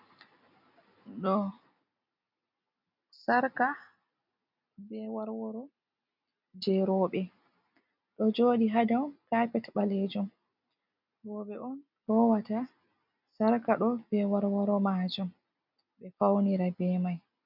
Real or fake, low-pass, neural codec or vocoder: real; 5.4 kHz; none